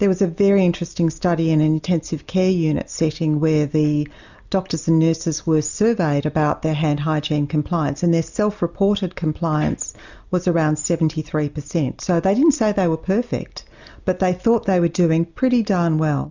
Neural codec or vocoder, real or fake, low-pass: none; real; 7.2 kHz